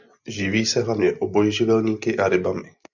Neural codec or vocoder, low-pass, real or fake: none; 7.2 kHz; real